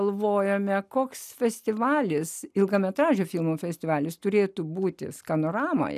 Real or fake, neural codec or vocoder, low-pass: real; none; 14.4 kHz